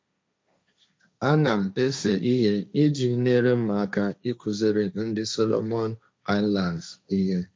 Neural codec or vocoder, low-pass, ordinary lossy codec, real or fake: codec, 16 kHz, 1.1 kbps, Voila-Tokenizer; none; none; fake